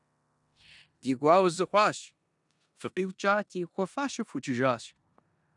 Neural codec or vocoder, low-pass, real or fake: codec, 16 kHz in and 24 kHz out, 0.9 kbps, LongCat-Audio-Codec, four codebook decoder; 10.8 kHz; fake